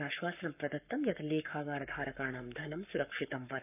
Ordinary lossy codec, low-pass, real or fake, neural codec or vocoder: none; 3.6 kHz; fake; codec, 44.1 kHz, 7.8 kbps, Pupu-Codec